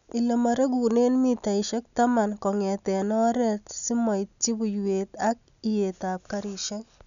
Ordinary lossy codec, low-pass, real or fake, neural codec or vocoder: none; 7.2 kHz; real; none